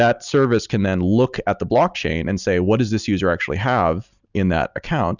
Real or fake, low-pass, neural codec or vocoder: real; 7.2 kHz; none